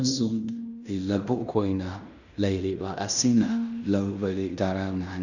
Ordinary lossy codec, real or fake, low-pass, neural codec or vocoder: none; fake; 7.2 kHz; codec, 16 kHz in and 24 kHz out, 0.9 kbps, LongCat-Audio-Codec, fine tuned four codebook decoder